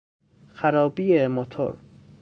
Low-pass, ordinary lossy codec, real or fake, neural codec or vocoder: 9.9 kHz; MP3, 64 kbps; fake; codec, 44.1 kHz, 7.8 kbps, Pupu-Codec